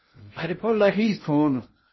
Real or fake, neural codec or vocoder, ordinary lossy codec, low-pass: fake; codec, 16 kHz in and 24 kHz out, 0.6 kbps, FocalCodec, streaming, 2048 codes; MP3, 24 kbps; 7.2 kHz